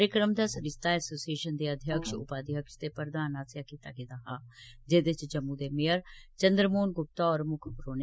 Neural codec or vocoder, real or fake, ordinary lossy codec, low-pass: none; real; none; none